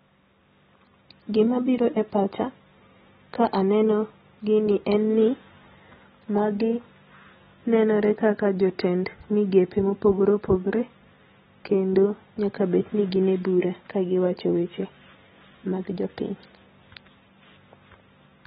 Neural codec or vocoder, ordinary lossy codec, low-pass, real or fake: none; AAC, 16 kbps; 14.4 kHz; real